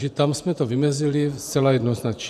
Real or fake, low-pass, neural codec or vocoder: fake; 14.4 kHz; vocoder, 48 kHz, 128 mel bands, Vocos